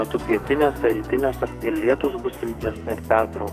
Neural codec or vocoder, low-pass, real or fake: codec, 44.1 kHz, 2.6 kbps, SNAC; 14.4 kHz; fake